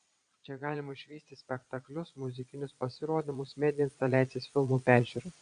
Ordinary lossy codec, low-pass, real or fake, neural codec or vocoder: MP3, 64 kbps; 9.9 kHz; fake; vocoder, 22.05 kHz, 80 mel bands, Vocos